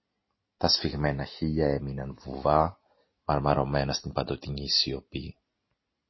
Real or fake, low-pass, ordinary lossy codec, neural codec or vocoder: real; 7.2 kHz; MP3, 24 kbps; none